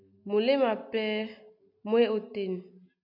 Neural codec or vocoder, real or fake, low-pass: none; real; 5.4 kHz